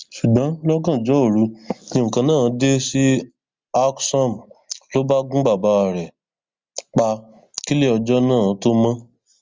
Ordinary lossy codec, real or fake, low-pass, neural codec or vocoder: Opus, 32 kbps; real; 7.2 kHz; none